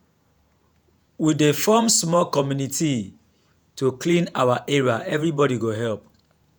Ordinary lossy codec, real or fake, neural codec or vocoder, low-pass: none; fake; vocoder, 48 kHz, 128 mel bands, Vocos; none